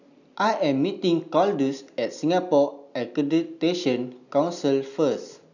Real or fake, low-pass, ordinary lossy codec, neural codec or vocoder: real; 7.2 kHz; none; none